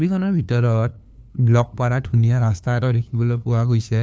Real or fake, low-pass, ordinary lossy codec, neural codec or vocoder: fake; none; none; codec, 16 kHz, 2 kbps, FunCodec, trained on LibriTTS, 25 frames a second